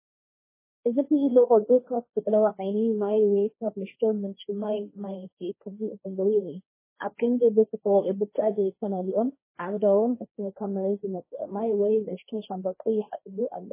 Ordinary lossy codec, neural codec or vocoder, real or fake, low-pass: MP3, 16 kbps; codec, 16 kHz, 1.1 kbps, Voila-Tokenizer; fake; 3.6 kHz